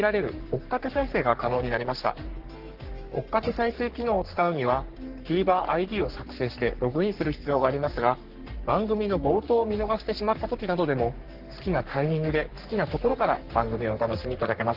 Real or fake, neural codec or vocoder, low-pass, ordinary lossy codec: fake; codec, 44.1 kHz, 3.4 kbps, Pupu-Codec; 5.4 kHz; Opus, 16 kbps